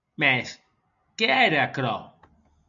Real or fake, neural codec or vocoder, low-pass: real; none; 7.2 kHz